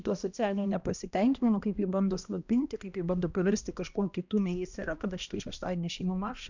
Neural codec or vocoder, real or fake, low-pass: codec, 16 kHz, 1 kbps, X-Codec, HuBERT features, trained on balanced general audio; fake; 7.2 kHz